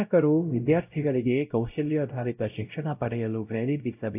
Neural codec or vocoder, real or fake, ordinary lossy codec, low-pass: codec, 16 kHz, 0.5 kbps, X-Codec, WavLM features, trained on Multilingual LibriSpeech; fake; AAC, 32 kbps; 3.6 kHz